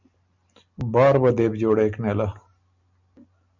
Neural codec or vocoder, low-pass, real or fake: none; 7.2 kHz; real